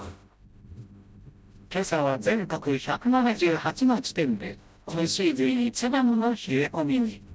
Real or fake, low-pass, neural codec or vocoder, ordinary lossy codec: fake; none; codec, 16 kHz, 0.5 kbps, FreqCodec, smaller model; none